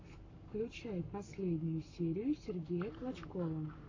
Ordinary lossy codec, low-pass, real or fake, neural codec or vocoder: AAC, 48 kbps; 7.2 kHz; fake; codec, 44.1 kHz, 7.8 kbps, Pupu-Codec